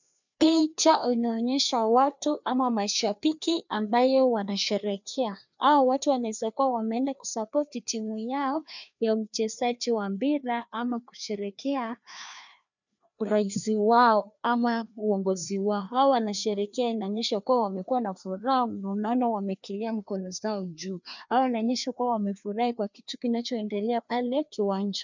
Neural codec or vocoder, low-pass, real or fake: codec, 16 kHz, 2 kbps, FreqCodec, larger model; 7.2 kHz; fake